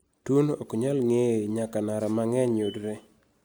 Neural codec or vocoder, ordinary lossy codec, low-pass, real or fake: none; none; none; real